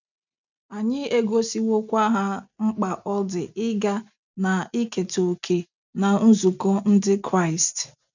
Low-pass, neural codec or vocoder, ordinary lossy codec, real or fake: 7.2 kHz; none; none; real